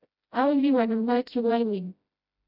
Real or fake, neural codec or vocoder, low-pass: fake; codec, 16 kHz, 0.5 kbps, FreqCodec, smaller model; 5.4 kHz